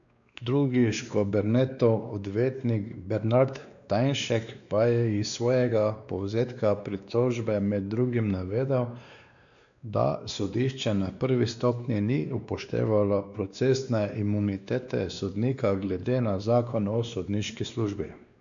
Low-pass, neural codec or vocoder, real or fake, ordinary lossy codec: 7.2 kHz; codec, 16 kHz, 2 kbps, X-Codec, WavLM features, trained on Multilingual LibriSpeech; fake; MP3, 96 kbps